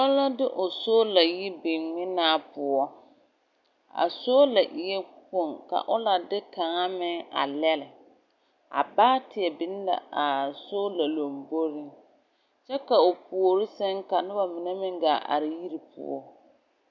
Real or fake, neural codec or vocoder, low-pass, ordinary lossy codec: real; none; 7.2 kHz; MP3, 64 kbps